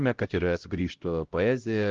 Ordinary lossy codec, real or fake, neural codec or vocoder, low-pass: Opus, 16 kbps; fake; codec, 16 kHz, 0.5 kbps, X-Codec, HuBERT features, trained on LibriSpeech; 7.2 kHz